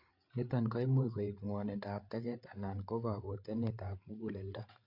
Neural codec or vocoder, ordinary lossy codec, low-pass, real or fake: codec, 16 kHz, 8 kbps, FreqCodec, larger model; MP3, 48 kbps; 5.4 kHz; fake